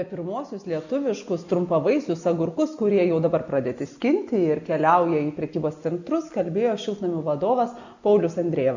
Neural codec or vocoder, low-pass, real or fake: none; 7.2 kHz; real